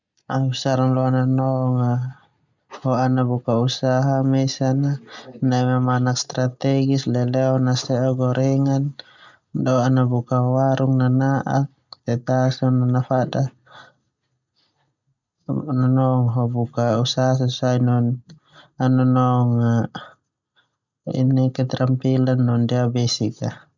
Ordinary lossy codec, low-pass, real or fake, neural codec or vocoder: none; 7.2 kHz; real; none